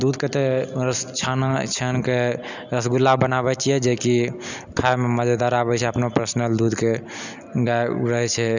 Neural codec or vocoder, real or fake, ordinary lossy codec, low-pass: none; real; none; 7.2 kHz